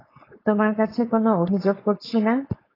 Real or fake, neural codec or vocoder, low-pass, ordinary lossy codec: fake; codec, 16 kHz, 4.8 kbps, FACodec; 5.4 kHz; AAC, 24 kbps